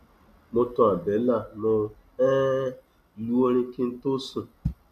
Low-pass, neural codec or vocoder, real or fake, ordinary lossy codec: 14.4 kHz; none; real; none